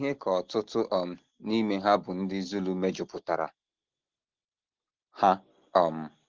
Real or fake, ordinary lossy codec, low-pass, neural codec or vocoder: real; Opus, 16 kbps; 7.2 kHz; none